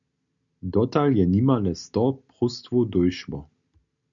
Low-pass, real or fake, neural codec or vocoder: 7.2 kHz; real; none